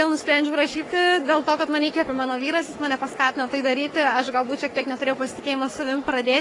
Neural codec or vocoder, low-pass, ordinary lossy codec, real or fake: codec, 44.1 kHz, 3.4 kbps, Pupu-Codec; 10.8 kHz; AAC, 32 kbps; fake